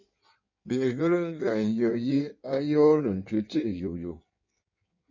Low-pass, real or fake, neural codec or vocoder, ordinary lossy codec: 7.2 kHz; fake; codec, 16 kHz in and 24 kHz out, 1.1 kbps, FireRedTTS-2 codec; MP3, 32 kbps